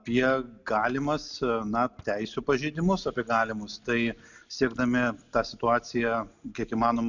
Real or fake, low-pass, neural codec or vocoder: real; 7.2 kHz; none